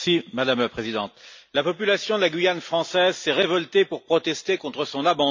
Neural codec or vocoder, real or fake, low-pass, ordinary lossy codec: none; real; 7.2 kHz; MP3, 64 kbps